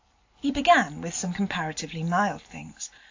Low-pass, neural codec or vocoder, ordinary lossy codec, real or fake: 7.2 kHz; none; AAC, 48 kbps; real